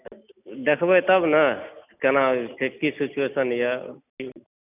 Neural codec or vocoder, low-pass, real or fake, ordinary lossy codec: none; 3.6 kHz; real; none